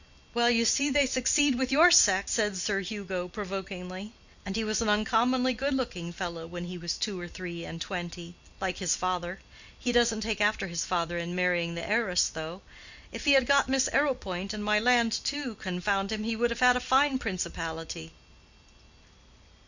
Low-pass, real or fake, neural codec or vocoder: 7.2 kHz; real; none